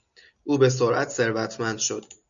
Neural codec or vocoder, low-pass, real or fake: none; 7.2 kHz; real